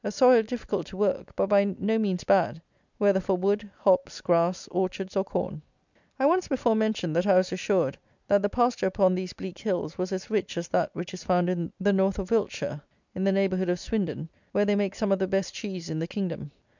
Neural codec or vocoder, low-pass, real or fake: none; 7.2 kHz; real